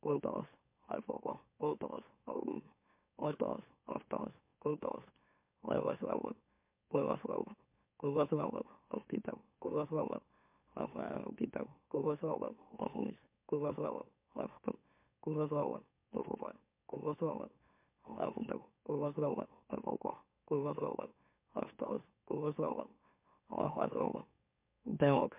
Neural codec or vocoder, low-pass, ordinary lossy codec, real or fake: autoencoder, 44.1 kHz, a latent of 192 numbers a frame, MeloTTS; 3.6 kHz; MP3, 32 kbps; fake